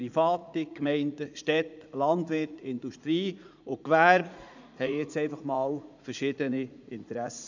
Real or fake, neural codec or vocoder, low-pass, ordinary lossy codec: fake; vocoder, 44.1 kHz, 80 mel bands, Vocos; 7.2 kHz; none